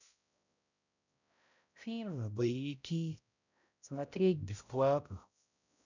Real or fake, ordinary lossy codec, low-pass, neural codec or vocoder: fake; none; 7.2 kHz; codec, 16 kHz, 0.5 kbps, X-Codec, HuBERT features, trained on balanced general audio